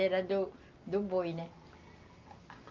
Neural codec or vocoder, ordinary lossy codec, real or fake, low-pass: none; Opus, 32 kbps; real; 7.2 kHz